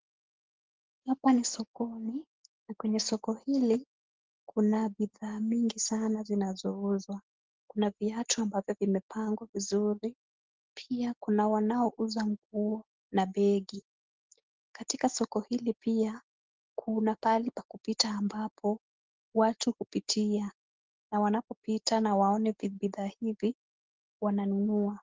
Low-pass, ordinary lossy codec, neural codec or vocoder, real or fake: 7.2 kHz; Opus, 16 kbps; none; real